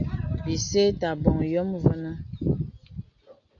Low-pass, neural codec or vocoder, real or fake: 7.2 kHz; none; real